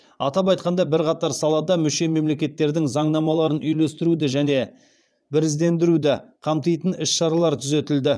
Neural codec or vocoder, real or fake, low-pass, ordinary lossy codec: vocoder, 22.05 kHz, 80 mel bands, Vocos; fake; none; none